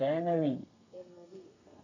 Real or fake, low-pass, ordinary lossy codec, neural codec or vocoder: fake; 7.2 kHz; none; codec, 32 kHz, 1.9 kbps, SNAC